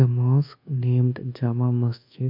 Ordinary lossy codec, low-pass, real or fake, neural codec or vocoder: MP3, 48 kbps; 5.4 kHz; fake; codec, 24 kHz, 1.2 kbps, DualCodec